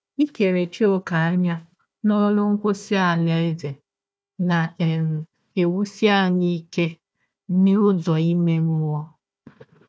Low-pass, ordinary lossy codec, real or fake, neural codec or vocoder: none; none; fake; codec, 16 kHz, 1 kbps, FunCodec, trained on Chinese and English, 50 frames a second